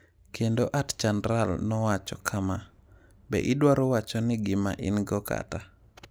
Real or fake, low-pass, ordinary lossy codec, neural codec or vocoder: real; none; none; none